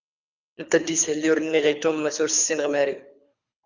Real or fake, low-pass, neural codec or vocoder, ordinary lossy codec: fake; 7.2 kHz; codec, 24 kHz, 3 kbps, HILCodec; Opus, 64 kbps